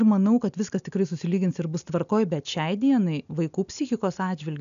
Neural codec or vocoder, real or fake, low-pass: none; real; 7.2 kHz